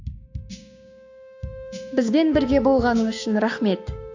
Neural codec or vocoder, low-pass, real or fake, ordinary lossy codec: codec, 16 kHz, 6 kbps, DAC; 7.2 kHz; fake; AAC, 48 kbps